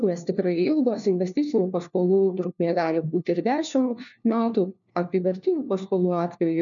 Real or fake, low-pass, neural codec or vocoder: fake; 7.2 kHz; codec, 16 kHz, 1 kbps, FunCodec, trained on LibriTTS, 50 frames a second